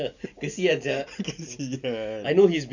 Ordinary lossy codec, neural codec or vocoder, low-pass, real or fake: none; none; 7.2 kHz; real